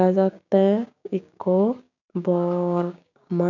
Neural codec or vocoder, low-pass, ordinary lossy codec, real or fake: codec, 16 kHz, 6 kbps, DAC; 7.2 kHz; none; fake